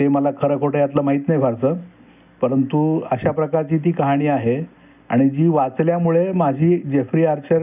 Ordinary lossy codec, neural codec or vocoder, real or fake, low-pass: none; none; real; 3.6 kHz